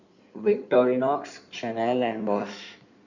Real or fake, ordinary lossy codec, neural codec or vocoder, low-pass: fake; none; codec, 16 kHz in and 24 kHz out, 2.2 kbps, FireRedTTS-2 codec; 7.2 kHz